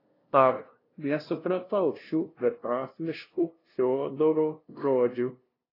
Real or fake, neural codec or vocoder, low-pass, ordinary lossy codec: fake; codec, 16 kHz, 0.5 kbps, FunCodec, trained on LibriTTS, 25 frames a second; 5.4 kHz; AAC, 24 kbps